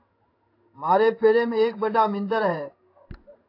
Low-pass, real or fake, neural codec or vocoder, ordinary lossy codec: 5.4 kHz; fake; codec, 16 kHz in and 24 kHz out, 1 kbps, XY-Tokenizer; AAC, 32 kbps